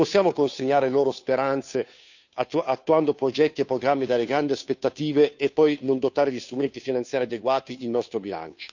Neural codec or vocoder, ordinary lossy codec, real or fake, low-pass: codec, 16 kHz, 2 kbps, FunCodec, trained on Chinese and English, 25 frames a second; none; fake; 7.2 kHz